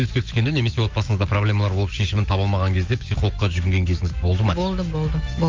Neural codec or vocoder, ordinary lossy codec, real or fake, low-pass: autoencoder, 48 kHz, 128 numbers a frame, DAC-VAE, trained on Japanese speech; Opus, 24 kbps; fake; 7.2 kHz